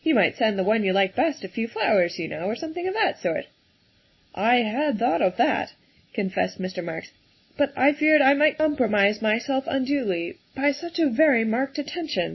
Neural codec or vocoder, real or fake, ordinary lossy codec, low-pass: none; real; MP3, 24 kbps; 7.2 kHz